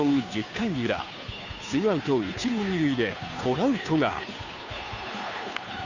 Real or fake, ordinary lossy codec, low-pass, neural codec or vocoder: fake; none; 7.2 kHz; codec, 16 kHz, 2 kbps, FunCodec, trained on Chinese and English, 25 frames a second